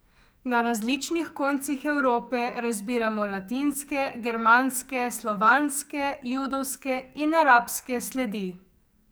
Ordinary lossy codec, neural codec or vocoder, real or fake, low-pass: none; codec, 44.1 kHz, 2.6 kbps, SNAC; fake; none